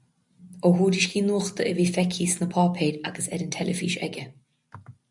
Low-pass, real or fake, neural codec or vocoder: 10.8 kHz; real; none